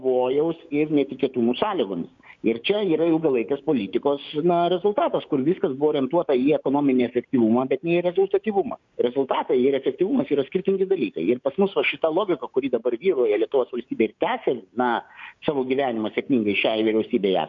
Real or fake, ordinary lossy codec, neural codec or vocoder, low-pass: fake; MP3, 48 kbps; codec, 16 kHz, 6 kbps, DAC; 7.2 kHz